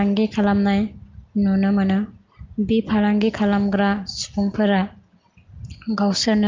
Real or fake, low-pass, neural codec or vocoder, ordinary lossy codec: real; 7.2 kHz; none; Opus, 24 kbps